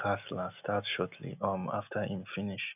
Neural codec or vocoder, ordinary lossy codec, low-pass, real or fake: none; none; 3.6 kHz; real